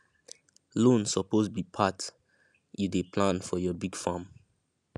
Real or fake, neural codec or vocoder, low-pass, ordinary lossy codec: real; none; none; none